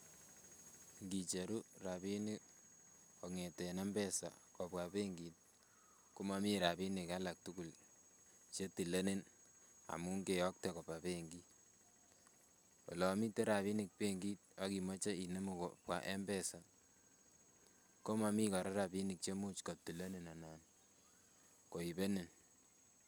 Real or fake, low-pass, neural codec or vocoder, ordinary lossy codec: real; none; none; none